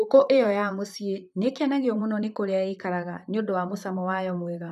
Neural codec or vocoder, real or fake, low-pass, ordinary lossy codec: vocoder, 44.1 kHz, 128 mel bands, Pupu-Vocoder; fake; 14.4 kHz; none